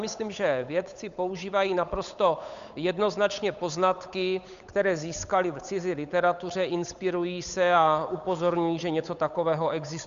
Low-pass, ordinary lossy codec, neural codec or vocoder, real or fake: 7.2 kHz; Opus, 64 kbps; codec, 16 kHz, 8 kbps, FunCodec, trained on Chinese and English, 25 frames a second; fake